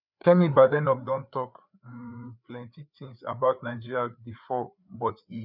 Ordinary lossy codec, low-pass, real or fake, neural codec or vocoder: none; 5.4 kHz; fake; codec, 16 kHz, 4 kbps, FreqCodec, larger model